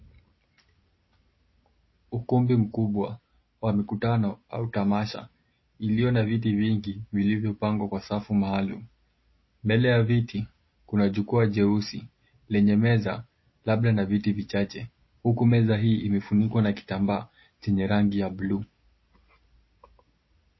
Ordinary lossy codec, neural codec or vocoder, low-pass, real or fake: MP3, 24 kbps; none; 7.2 kHz; real